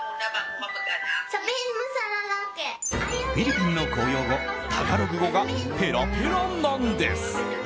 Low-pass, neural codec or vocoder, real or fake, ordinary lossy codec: none; none; real; none